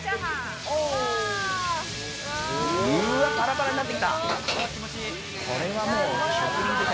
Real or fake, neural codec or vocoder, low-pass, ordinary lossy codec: real; none; none; none